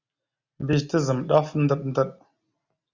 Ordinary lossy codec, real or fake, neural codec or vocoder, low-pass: Opus, 64 kbps; real; none; 7.2 kHz